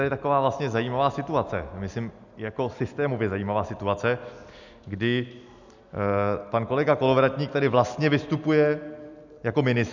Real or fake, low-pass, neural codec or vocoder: real; 7.2 kHz; none